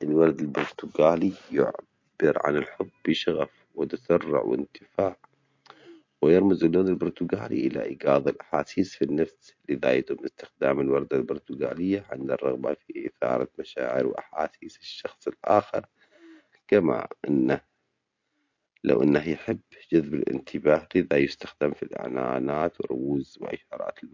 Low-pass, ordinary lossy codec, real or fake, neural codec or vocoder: 7.2 kHz; MP3, 48 kbps; real; none